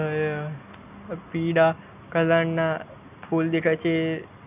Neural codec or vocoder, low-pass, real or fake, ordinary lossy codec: none; 3.6 kHz; real; none